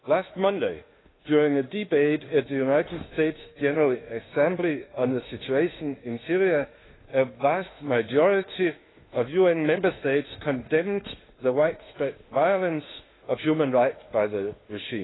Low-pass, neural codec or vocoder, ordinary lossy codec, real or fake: 7.2 kHz; autoencoder, 48 kHz, 32 numbers a frame, DAC-VAE, trained on Japanese speech; AAC, 16 kbps; fake